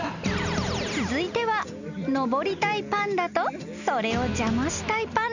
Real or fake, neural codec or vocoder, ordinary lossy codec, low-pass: real; none; none; 7.2 kHz